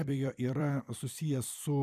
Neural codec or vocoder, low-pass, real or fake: vocoder, 44.1 kHz, 128 mel bands every 256 samples, BigVGAN v2; 14.4 kHz; fake